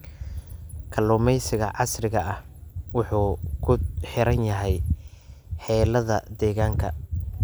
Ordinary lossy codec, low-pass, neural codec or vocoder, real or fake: none; none; none; real